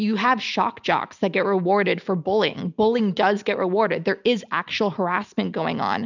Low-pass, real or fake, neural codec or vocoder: 7.2 kHz; real; none